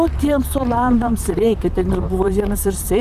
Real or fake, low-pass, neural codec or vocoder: fake; 14.4 kHz; vocoder, 44.1 kHz, 128 mel bands, Pupu-Vocoder